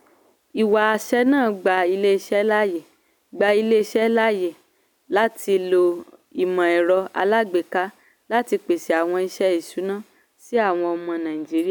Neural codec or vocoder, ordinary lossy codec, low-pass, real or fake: none; none; 19.8 kHz; real